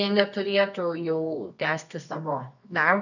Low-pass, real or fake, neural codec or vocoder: 7.2 kHz; fake; codec, 24 kHz, 0.9 kbps, WavTokenizer, medium music audio release